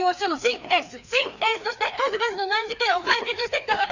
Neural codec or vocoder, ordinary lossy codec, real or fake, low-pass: codec, 16 kHz, 2 kbps, FreqCodec, larger model; none; fake; 7.2 kHz